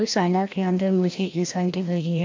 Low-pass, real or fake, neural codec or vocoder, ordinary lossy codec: 7.2 kHz; fake; codec, 16 kHz, 1 kbps, FreqCodec, larger model; MP3, 48 kbps